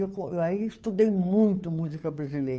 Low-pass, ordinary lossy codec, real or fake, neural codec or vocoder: none; none; fake; codec, 16 kHz, 2 kbps, FunCodec, trained on Chinese and English, 25 frames a second